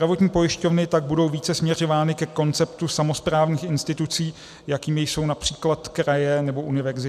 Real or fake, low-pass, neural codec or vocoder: real; 14.4 kHz; none